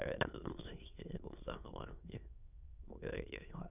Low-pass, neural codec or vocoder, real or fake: 3.6 kHz; autoencoder, 22.05 kHz, a latent of 192 numbers a frame, VITS, trained on many speakers; fake